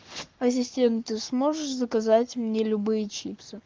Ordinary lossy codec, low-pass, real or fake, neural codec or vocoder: Opus, 24 kbps; 7.2 kHz; fake; codec, 44.1 kHz, 7.8 kbps, Pupu-Codec